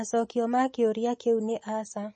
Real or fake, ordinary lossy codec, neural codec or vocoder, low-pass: real; MP3, 32 kbps; none; 10.8 kHz